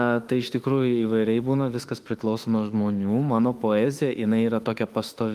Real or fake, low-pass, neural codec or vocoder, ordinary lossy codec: fake; 14.4 kHz; autoencoder, 48 kHz, 32 numbers a frame, DAC-VAE, trained on Japanese speech; Opus, 32 kbps